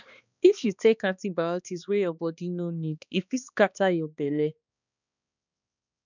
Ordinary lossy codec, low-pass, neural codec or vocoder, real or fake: none; 7.2 kHz; codec, 16 kHz, 2 kbps, X-Codec, HuBERT features, trained on balanced general audio; fake